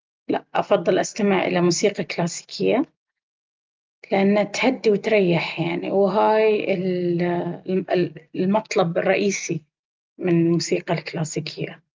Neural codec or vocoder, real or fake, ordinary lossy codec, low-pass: none; real; Opus, 32 kbps; 7.2 kHz